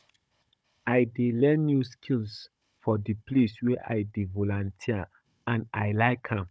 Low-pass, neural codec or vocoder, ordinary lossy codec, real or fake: none; codec, 16 kHz, 16 kbps, FunCodec, trained on Chinese and English, 50 frames a second; none; fake